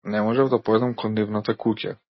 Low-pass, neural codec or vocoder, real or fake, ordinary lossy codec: 7.2 kHz; none; real; MP3, 24 kbps